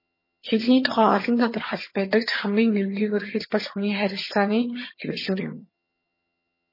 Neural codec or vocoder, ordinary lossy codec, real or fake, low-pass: vocoder, 22.05 kHz, 80 mel bands, HiFi-GAN; MP3, 24 kbps; fake; 5.4 kHz